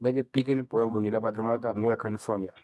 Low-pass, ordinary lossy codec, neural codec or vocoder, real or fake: none; none; codec, 24 kHz, 0.9 kbps, WavTokenizer, medium music audio release; fake